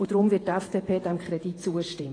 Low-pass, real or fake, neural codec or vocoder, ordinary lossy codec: 9.9 kHz; fake; vocoder, 48 kHz, 128 mel bands, Vocos; AAC, 32 kbps